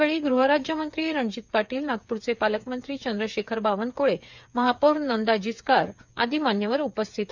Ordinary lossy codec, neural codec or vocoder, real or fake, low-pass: none; codec, 16 kHz, 8 kbps, FreqCodec, smaller model; fake; 7.2 kHz